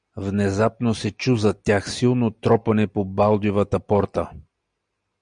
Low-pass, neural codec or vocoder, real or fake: 9.9 kHz; none; real